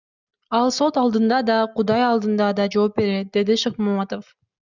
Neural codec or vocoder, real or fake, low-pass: none; real; 7.2 kHz